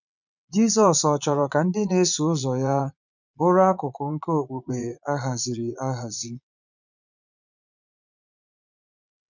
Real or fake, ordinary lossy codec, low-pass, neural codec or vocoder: fake; none; 7.2 kHz; vocoder, 22.05 kHz, 80 mel bands, Vocos